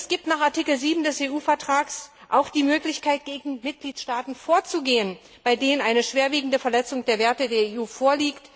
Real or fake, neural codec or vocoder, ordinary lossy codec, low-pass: real; none; none; none